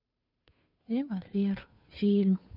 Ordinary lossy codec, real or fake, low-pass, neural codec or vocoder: none; fake; 5.4 kHz; codec, 16 kHz, 2 kbps, FunCodec, trained on Chinese and English, 25 frames a second